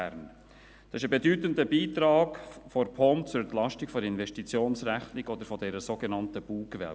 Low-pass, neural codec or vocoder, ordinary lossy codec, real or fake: none; none; none; real